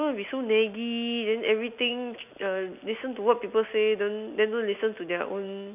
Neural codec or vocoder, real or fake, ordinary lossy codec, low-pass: none; real; none; 3.6 kHz